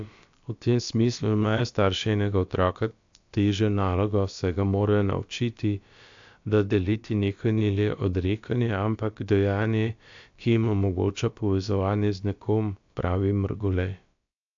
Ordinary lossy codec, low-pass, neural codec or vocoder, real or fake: AAC, 64 kbps; 7.2 kHz; codec, 16 kHz, about 1 kbps, DyCAST, with the encoder's durations; fake